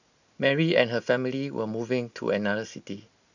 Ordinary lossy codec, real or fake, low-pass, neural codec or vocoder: none; real; 7.2 kHz; none